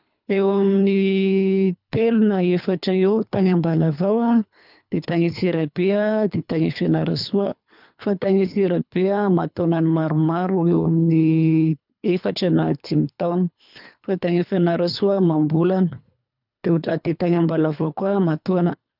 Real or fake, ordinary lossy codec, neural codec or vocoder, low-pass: fake; none; codec, 24 kHz, 3 kbps, HILCodec; 5.4 kHz